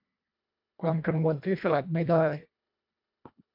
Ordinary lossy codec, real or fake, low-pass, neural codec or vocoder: MP3, 48 kbps; fake; 5.4 kHz; codec, 24 kHz, 1.5 kbps, HILCodec